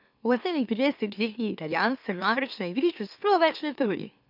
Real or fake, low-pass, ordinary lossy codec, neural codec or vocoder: fake; 5.4 kHz; none; autoencoder, 44.1 kHz, a latent of 192 numbers a frame, MeloTTS